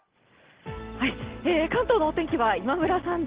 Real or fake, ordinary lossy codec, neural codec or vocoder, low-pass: real; Opus, 16 kbps; none; 3.6 kHz